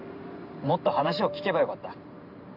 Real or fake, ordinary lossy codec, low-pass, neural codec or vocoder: fake; none; 5.4 kHz; vocoder, 44.1 kHz, 128 mel bands every 512 samples, BigVGAN v2